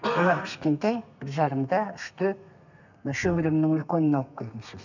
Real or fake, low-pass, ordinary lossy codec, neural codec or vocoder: fake; 7.2 kHz; none; codec, 32 kHz, 1.9 kbps, SNAC